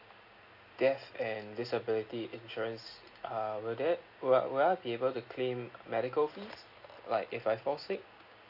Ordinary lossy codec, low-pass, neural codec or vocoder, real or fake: AAC, 48 kbps; 5.4 kHz; none; real